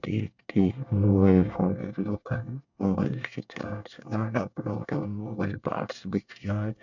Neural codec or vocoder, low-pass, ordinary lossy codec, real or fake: codec, 24 kHz, 1 kbps, SNAC; 7.2 kHz; none; fake